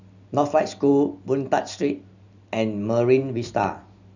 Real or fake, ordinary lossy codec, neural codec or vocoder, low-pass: real; none; none; 7.2 kHz